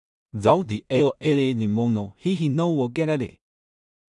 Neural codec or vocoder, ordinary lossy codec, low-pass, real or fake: codec, 16 kHz in and 24 kHz out, 0.4 kbps, LongCat-Audio-Codec, two codebook decoder; none; 10.8 kHz; fake